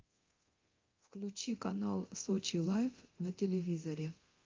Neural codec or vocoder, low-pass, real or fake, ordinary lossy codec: codec, 24 kHz, 0.9 kbps, DualCodec; 7.2 kHz; fake; Opus, 32 kbps